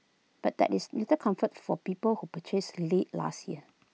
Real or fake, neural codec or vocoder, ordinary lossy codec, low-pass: real; none; none; none